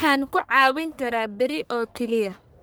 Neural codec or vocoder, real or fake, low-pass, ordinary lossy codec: codec, 44.1 kHz, 1.7 kbps, Pupu-Codec; fake; none; none